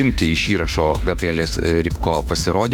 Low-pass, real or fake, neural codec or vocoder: 19.8 kHz; fake; autoencoder, 48 kHz, 32 numbers a frame, DAC-VAE, trained on Japanese speech